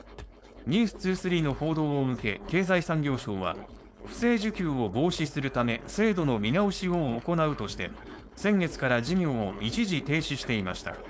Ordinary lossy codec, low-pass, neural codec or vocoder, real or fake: none; none; codec, 16 kHz, 4.8 kbps, FACodec; fake